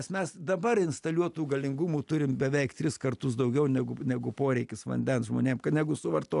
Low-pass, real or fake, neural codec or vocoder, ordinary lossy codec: 10.8 kHz; real; none; AAC, 96 kbps